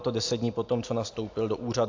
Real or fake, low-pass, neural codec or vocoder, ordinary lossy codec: real; 7.2 kHz; none; AAC, 48 kbps